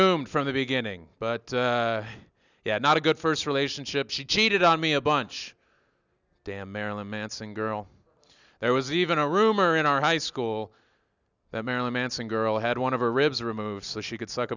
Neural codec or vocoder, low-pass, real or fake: none; 7.2 kHz; real